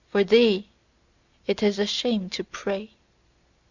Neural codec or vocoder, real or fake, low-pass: none; real; 7.2 kHz